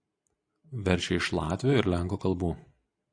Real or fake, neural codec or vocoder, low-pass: real; none; 9.9 kHz